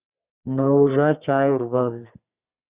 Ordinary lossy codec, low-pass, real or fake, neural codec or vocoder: Opus, 64 kbps; 3.6 kHz; fake; codec, 32 kHz, 1.9 kbps, SNAC